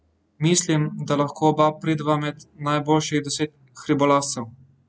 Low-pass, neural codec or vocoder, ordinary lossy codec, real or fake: none; none; none; real